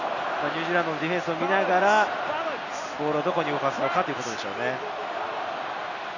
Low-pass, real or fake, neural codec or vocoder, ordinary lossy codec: 7.2 kHz; real; none; none